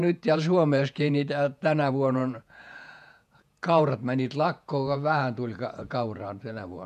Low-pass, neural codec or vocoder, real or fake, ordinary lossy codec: 14.4 kHz; vocoder, 48 kHz, 128 mel bands, Vocos; fake; none